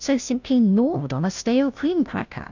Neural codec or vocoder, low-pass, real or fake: codec, 16 kHz, 0.5 kbps, FunCodec, trained on Chinese and English, 25 frames a second; 7.2 kHz; fake